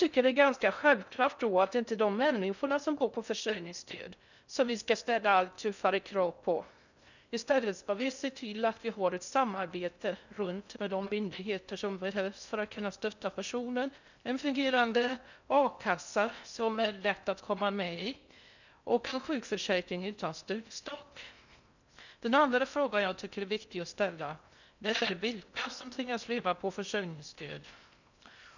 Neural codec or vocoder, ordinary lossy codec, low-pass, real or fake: codec, 16 kHz in and 24 kHz out, 0.6 kbps, FocalCodec, streaming, 2048 codes; none; 7.2 kHz; fake